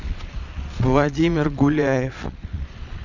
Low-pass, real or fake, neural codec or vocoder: 7.2 kHz; fake; vocoder, 22.05 kHz, 80 mel bands, Vocos